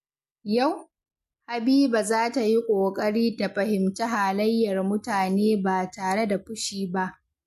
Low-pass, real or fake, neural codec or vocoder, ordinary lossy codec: 14.4 kHz; real; none; MP3, 64 kbps